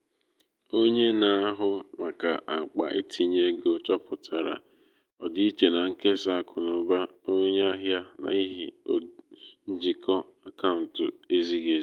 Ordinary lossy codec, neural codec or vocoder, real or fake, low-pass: Opus, 32 kbps; autoencoder, 48 kHz, 128 numbers a frame, DAC-VAE, trained on Japanese speech; fake; 19.8 kHz